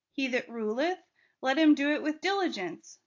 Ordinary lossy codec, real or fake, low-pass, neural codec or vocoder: AAC, 48 kbps; real; 7.2 kHz; none